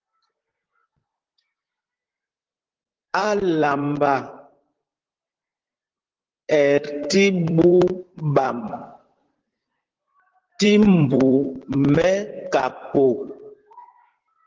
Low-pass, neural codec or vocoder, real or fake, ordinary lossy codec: 7.2 kHz; vocoder, 44.1 kHz, 128 mel bands, Pupu-Vocoder; fake; Opus, 16 kbps